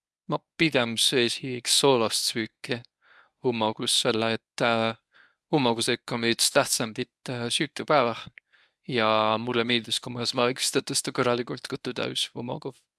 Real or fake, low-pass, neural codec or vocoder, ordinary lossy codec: fake; none; codec, 24 kHz, 0.9 kbps, WavTokenizer, medium speech release version 1; none